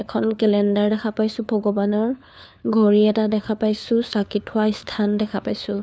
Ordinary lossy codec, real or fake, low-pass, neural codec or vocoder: none; fake; none; codec, 16 kHz, 4 kbps, FunCodec, trained on LibriTTS, 50 frames a second